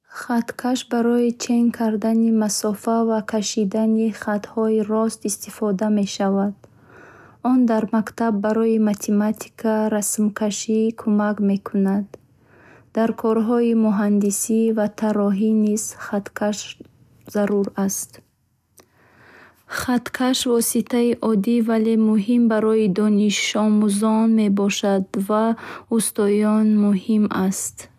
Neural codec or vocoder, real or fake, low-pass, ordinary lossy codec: none; real; 14.4 kHz; none